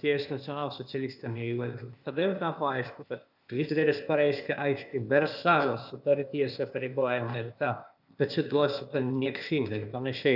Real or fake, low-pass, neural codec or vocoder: fake; 5.4 kHz; codec, 16 kHz, 0.8 kbps, ZipCodec